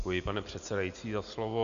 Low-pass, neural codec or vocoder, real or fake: 7.2 kHz; none; real